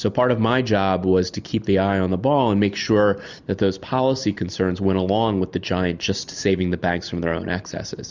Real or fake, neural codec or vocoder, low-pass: real; none; 7.2 kHz